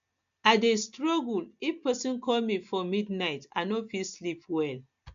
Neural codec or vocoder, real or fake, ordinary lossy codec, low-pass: none; real; MP3, 64 kbps; 7.2 kHz